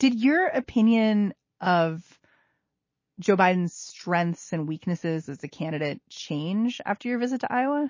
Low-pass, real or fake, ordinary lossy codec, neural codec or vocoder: 7.2 kHz; real; MP3, 32 kbps; none